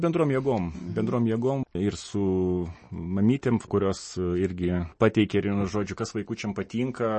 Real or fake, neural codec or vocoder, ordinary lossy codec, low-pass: real; none; MP3, 32 kbps; 10.8 kHz